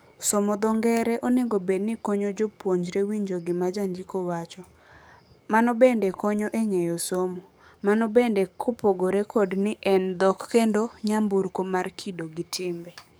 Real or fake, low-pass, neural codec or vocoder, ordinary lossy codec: fake; none; codec, 44.1 kHz, 7.8 kbps, DAC; none